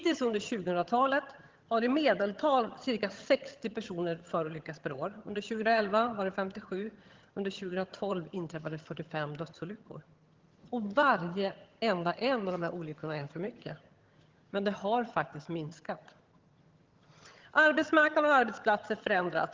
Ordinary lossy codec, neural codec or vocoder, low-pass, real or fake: Opus, 16 kbps; vocoder, 22.05 kHz, 80 mel bands, HiFi-GAN; 7.2 kHz; fake